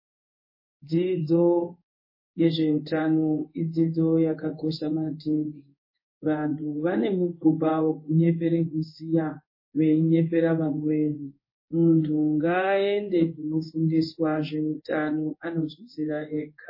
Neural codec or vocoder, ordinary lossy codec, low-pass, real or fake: codec, 16 kHz in and 24 kHz out, 1 kbps, XY-Tokenizer; MP3, 24 kbps; 5.4 kHz; fake